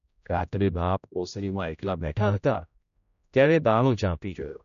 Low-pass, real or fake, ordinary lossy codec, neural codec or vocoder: 7.2 kHz; fake; none; codec, 16 kHz, 0.5 kbps, X-Codec, HuBERT features, trained on general audio